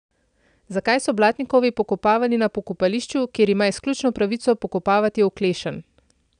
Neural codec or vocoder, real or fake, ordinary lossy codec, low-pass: none; real; none; 9.9 kHz